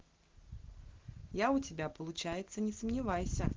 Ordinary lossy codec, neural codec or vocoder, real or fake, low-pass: Opus, 16 kbps; none; real; 7.2 kHz